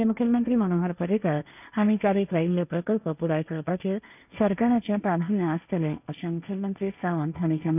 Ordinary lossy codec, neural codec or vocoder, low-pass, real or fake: none; codec, 16 kHz, 1.1 kbps, Voila-Tokenizer; 3.6 kHz; fake